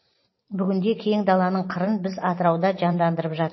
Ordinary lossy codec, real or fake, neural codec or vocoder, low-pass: MP3, 24 kbps; fake; vocoder, 44.1 kHz, 128 mel bands every 512 samples, BigVGAN v2; 7.2 kHz